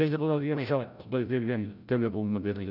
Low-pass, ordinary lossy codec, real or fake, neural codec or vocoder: 5.4 kHz; none; fake; codec, 16 kHz, 0.5 kbps, FreqCodec, larger model